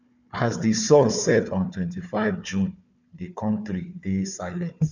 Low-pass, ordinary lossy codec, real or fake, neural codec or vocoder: 7.2 kHz; none; fake; codec, 16 kHz, 4 kbps, FunCodec, trained on Chinese and English, 50 frames a second